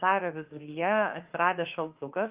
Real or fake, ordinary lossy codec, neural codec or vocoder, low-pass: fake; Opus, 32 kbps; autoencoder, 48 kHz, 32 numbers a frame, DAC-VAE, trained on Japanese speech; 3.6 kHz